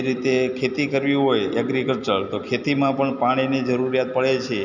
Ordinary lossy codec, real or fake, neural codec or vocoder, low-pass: none; real; none; 7.2 kHz